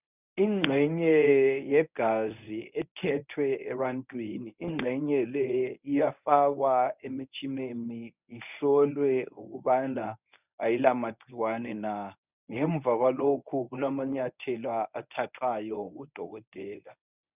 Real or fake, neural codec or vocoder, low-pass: fake; codec, 24 kHz, 0.9 kbps, WavTokenizer, medium speech release version 1; 3.6 kHz